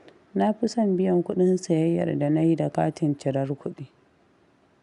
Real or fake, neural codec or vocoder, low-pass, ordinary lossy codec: real; none; 10.8 kHz; none